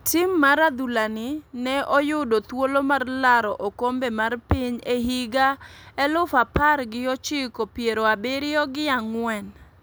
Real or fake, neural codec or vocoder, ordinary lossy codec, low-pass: real; none; none; none